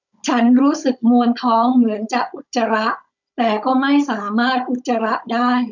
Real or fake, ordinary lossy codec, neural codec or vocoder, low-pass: fake; none; codec, 16 kHz, 16 kbps, FunCodec, trained on Chinese and English, 50 frames a second; 7.2 kHz